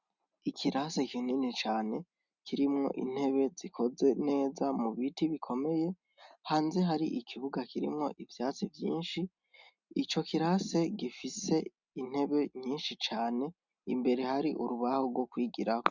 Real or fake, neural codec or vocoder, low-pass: real; none; 7.2 kHz